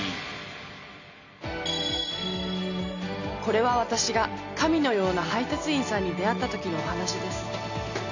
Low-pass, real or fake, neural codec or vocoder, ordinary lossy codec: 7.2 kHz; real; none; none